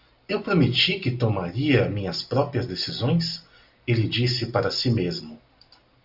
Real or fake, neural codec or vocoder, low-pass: real; none; 5.4 kHz